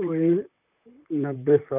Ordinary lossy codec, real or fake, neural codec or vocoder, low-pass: none; fake; vocoder, 44.1 kHz, 128 mel bands, Pupu-Vocoder; 3.6 kHz